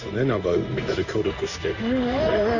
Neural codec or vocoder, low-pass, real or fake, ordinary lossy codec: codec, 16 kHz in and 24 kHz out, 1 kbps, XY-Tokenizer; 7.2 kHz; fake; none